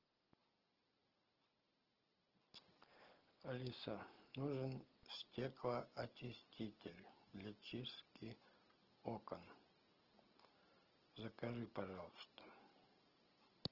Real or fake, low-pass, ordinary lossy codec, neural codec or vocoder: real; 5.4 kHz; Opus, 16 kbps; none